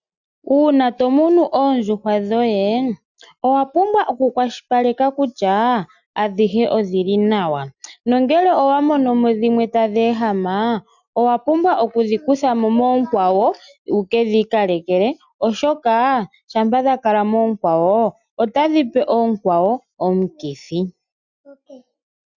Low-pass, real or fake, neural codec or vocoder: 7.2 kHz; real; none